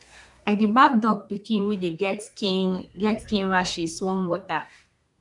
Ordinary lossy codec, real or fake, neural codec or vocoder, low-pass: none; fake; codec, 24 kHz, 1 kbps, SNAC; 10.8 kHz